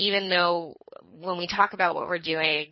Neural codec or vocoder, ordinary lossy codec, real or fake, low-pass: codec, 24 kHz, 3 kbps, HILCodec; MP3, 24 kbps; fake; 7.2 kHz